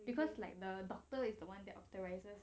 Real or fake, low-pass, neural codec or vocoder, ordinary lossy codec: real; none; none; none